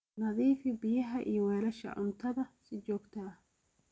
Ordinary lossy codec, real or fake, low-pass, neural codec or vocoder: none; real; none; none